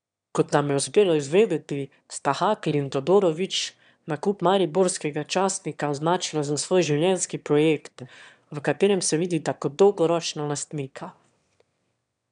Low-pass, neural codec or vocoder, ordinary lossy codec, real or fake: 9.9 kHz; autoencoder, 22.05 kHz, a latent of 192 numbers a frame, VITS, trained on one speaker; none; fake